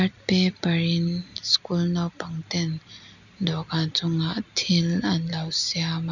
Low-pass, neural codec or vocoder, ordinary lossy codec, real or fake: 7.2 kHz; none; none; real